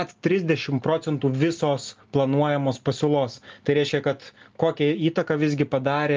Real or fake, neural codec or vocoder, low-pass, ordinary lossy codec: real; none; 7.2 kHz; Opus, 32 kbps